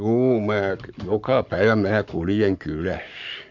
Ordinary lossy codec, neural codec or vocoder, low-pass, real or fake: none; codec, 44.1 kHz, 7.8 kbps, Pupu-Codec; 7.2 kHz; fake